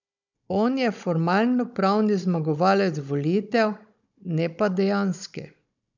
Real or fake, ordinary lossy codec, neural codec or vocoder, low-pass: fake; none; codec, 16 kHz, 16 kbps, FunCodec, trained on Chinese and English, 50 frames a second; 7.2 kHz